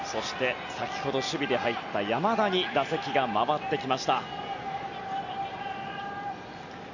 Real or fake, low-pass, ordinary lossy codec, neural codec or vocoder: real; 7.2 kHz; none; none